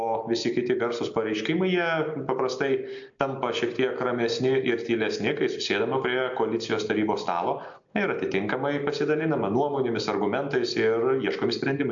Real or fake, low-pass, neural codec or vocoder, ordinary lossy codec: real; 7.2 kHz; none; AAC, 64 kbps